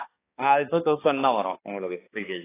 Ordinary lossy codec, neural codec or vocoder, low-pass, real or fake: AAC, 16 kbps; codec, 16 kHz, 2 kbps, X-Codec, HuBERT features, trained on balanced general audio; 3.6 kHz; fake